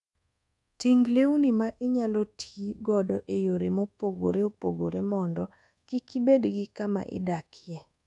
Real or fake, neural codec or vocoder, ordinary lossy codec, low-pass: fake; codec, 24 kHz, 1.2 kbps, DualCodec; none; 10.8 kHz